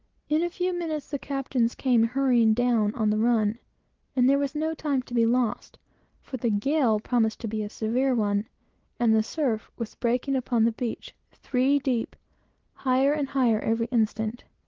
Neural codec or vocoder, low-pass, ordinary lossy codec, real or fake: none; 7.2 kHz; Opus, 16 kbps; real